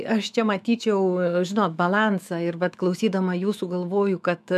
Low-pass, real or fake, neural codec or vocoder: 14.4 kHz; fake; autoencoder, 48 kHz, 128 numbers a frame, DAC-VAE, trained on Japanese speech